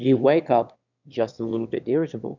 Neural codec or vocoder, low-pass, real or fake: autoencoder, 22.05 kHz, a latent of 192 numbers a frame, VITS, trained on one speaker; 7.2 kHz; fake